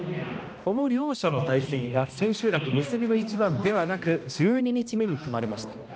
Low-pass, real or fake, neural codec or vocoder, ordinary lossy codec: none; fake; codec, 16 kHz, 1 kbps, X-Codec, HuBERT features, trained on balanced general audio; none